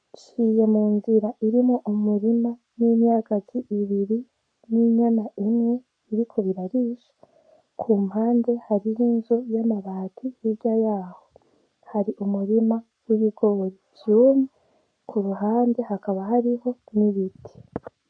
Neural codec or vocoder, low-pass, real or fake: codec, 44.1 kHz, 7.8 kbps, Pupu-Codec; 9.9 kHz; fake